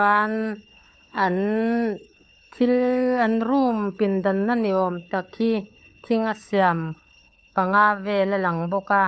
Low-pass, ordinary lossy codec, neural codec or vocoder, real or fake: none; none; codec, 16 kHz, 4 kbps, FunCodec, trained on LibriTTS, 50 frames a second; fake